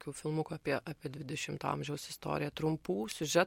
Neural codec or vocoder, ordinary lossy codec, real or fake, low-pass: none; MP3, 64 kbps; real; 19.8 kHz